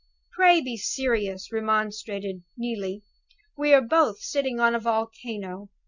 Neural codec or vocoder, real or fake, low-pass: none; real; 7.2 kHz